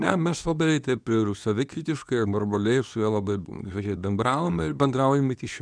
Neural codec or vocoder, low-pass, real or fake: codec, 24 kHz, 0.9 kbps, WavTokenizer, medium speech release version 2; 9.9 kHz; fake